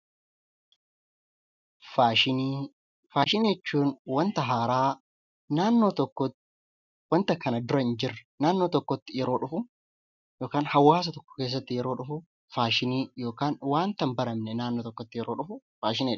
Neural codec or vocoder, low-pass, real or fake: none; 7.2 kHz; real